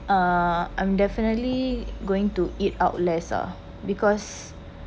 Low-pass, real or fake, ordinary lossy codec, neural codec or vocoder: none; real; none; none